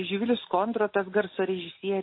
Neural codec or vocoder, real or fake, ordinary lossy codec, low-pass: none; real; MP3, 24 kbps; 5.4 kHz